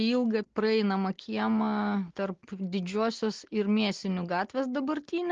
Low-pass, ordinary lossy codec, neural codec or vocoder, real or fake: 7.2 kHz; Opus, 16 kbps; none; real